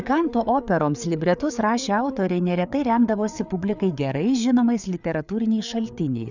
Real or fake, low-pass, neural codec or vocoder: fake; 7.2 kHz; codec, 16 kHz, 4 kbps, FreqCodec, larger model